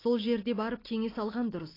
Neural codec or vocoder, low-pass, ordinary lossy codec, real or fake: none; 5.4 kHz; AAC, 24 kbps; real